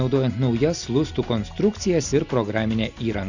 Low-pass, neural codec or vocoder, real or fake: 7.2 kHz; none; real